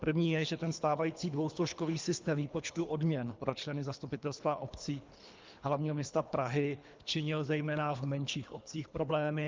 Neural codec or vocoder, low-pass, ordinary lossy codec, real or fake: codec, 24 kHz, 3 kbps, HILCodec; 7.2 kHz; Opus, 24 kbps; fake